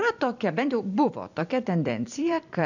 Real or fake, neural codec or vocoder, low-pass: fake; vocoder, 44.1 kHz, 128 mel bands every 256 samples, BigVGAN v2; 7.2 kHz